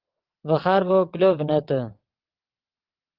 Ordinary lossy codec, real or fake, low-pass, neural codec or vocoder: Opus, 16 kbps; fake; 5.4 kHz; vocoder, 22.05 kHz, 80 mel bands, WaveNeXt